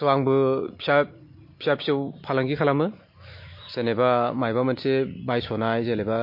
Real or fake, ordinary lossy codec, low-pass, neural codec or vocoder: real; MP3, 32 kbps; 5.4 kHz; none